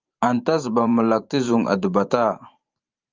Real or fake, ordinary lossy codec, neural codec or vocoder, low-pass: fake; Opus, 32 kbps; vocoder, 44.1 kHz, 128 mel bands every 512 samples, BigVGAN v2; 7.2 kHz